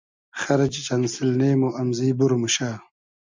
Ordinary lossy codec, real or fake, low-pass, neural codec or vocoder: MP3, 64 kbps; real; 7.2 kHz; none